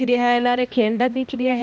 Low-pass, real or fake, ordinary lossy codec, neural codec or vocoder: none; fake; none; codec, 16 kHz, 1 kbps, X-Codec, HuBERT features, trained on balanced general audio